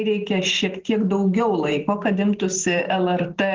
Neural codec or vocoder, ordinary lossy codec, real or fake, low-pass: none; Opus, 16 kbps; real; 7.2 kHz